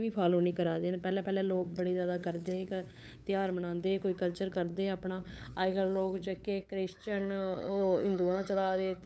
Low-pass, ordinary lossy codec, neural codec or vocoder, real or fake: none; none; codec, 16 kHz, 8 kbps, FunCodec, trained on LibriTTS, 25 frames a second; fake